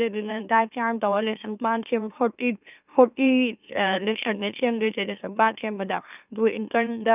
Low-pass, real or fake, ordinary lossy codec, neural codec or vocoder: 3.6 kHz; fake; none; autoencoder, 44.1 kHz, a latent of 192 numbers a frame, MeloTTS